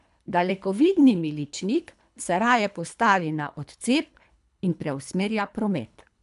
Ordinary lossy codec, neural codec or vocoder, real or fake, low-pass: none; codec, 24 kHz, 3 kbps, HILCodec; fake; 10.8 kHz